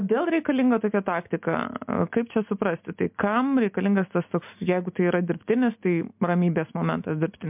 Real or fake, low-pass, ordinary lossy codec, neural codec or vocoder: real; 3.6 kHz; MP3, 32 kbps; none